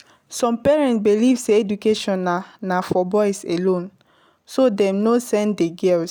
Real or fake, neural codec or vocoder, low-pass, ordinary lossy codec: real; none; none; none